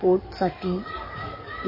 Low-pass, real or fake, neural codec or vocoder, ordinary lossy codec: 5.4 kHz; fake; codec, 44.1 kHz, 7.8 kbps, DAC; MP3, 24 kbps